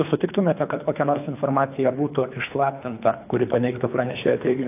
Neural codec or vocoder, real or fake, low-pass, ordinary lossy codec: codec, 24 kHz, 3 kbps, HILCodec; fake; 3.6 kHz; AAC, 32 kbps